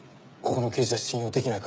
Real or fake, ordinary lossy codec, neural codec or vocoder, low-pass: fake; none; codec, 16 kHz, 16 kbps, FreqCodec, smaller model; none